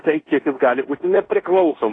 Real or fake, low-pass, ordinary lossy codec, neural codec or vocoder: fake; 9.9 kHz; AAC, 32 kbps; codec, 16 kHz in and 24 kHz out, 0.9 kbps, LongCat-Audio-Codec, fine tuned four codebook decoder